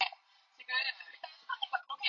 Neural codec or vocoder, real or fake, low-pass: none; real; 7.2 kHz